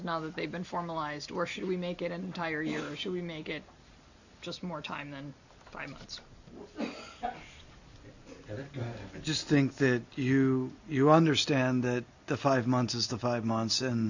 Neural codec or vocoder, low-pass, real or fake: none; 7.2 kHz; real